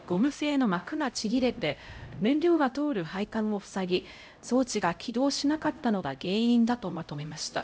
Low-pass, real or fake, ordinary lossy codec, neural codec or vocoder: none; fake; none; codec, 16 kHz, 0.5 kbps, X-Codec, HuBERT features, trained on LibriSpeech